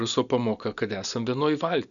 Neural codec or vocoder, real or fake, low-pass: none; real; 7.2 kHz